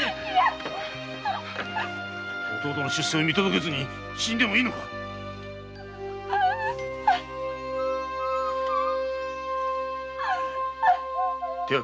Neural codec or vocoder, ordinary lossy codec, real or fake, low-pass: none; none; real; none